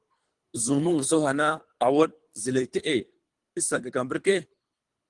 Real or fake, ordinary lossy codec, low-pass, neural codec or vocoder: fake; Opus, 24 kbps; 10.8 kHz; codec, 24 kHz, 3 kbps, HILCodec